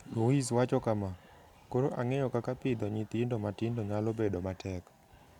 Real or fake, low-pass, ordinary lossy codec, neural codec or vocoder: real; 19.8 kHz; none; none